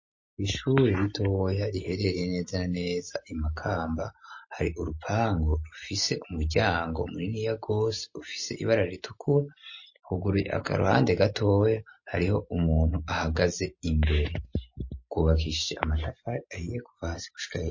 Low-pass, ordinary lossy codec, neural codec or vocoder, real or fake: 7.2 kHz; MP3, 32 kbps; none; real